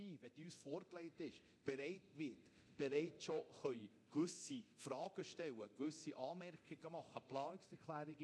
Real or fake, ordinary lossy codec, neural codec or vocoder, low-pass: fake; none; codec, 24 kHz, 0.9 kbps, DualCodec; none